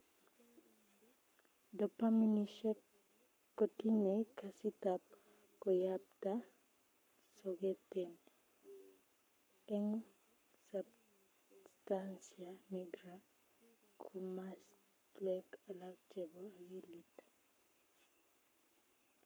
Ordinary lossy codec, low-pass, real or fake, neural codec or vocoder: none; none; fake; codec, 44.1 kHz, 7.8 kbps, Pupu-Codec